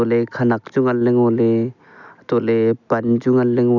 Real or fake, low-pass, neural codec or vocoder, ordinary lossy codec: real; 7.2 kHz; none; none